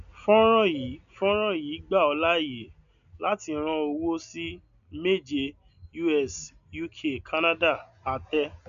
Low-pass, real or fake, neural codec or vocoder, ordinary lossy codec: 7.2 kHz; real; none; none